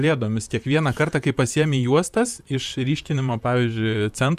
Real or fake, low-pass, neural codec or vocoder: fake; 14.4 kHz; vocoder, 44.1 kHz, 128 mel bands, Pupu-Vocoder